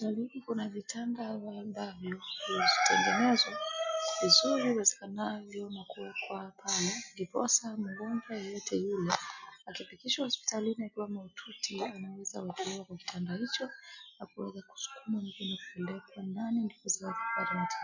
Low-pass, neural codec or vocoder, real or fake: 7.2 kHz; none; real